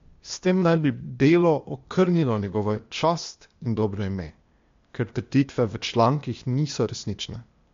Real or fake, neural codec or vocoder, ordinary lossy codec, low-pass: fake; codec, 16 kHz, 0.8 kbps, ZipCodec; MP3, 48 kbps; 7.2 kHz